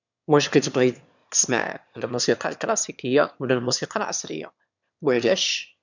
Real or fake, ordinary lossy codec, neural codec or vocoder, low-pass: fake; none; autoencoder, 22.05 kHz, a latent of 192 numbers a frame, VITS, trained on one speaker; 7.2 kHz